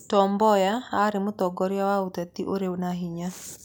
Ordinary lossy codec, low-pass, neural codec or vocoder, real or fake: none; none; none; real